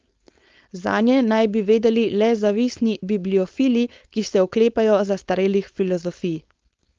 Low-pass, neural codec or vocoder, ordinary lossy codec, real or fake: 7.2 kHz; codec, 16 kHz, 4.8 kbps, FACodec; Opus, 32 kbps; fake